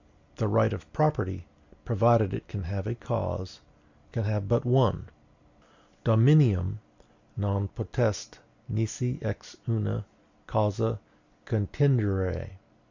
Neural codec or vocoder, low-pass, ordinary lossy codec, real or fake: none; 7.2 kHz; Opus, 64 kbps; real